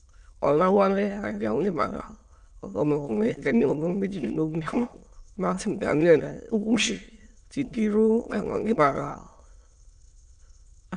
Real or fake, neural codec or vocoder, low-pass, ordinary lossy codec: fake; autoencoder, 22.05 kHz, a latent of 192 numbers a frame, VITS, trained on many speakers; 9.9 kHz; none